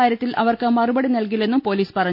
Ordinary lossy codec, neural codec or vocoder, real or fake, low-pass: none; none; real; 5.4 kHz